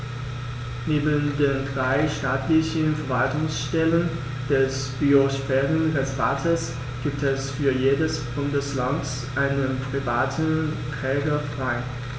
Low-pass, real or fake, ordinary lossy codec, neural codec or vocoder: none; real; none; none